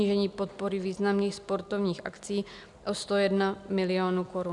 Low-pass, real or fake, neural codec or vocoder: 10.8 kHz; real; none